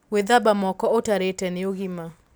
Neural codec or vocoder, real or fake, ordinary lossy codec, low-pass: none; real; none; none